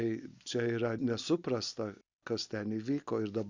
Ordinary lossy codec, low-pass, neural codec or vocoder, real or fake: Opus, 64 kbps; 7.2 kHz; none; real